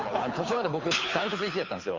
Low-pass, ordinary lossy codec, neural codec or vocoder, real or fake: 7.2 kHz; Opus, 32 kbps; codec, 24 kHz, 6 kbps, HILCodec; fake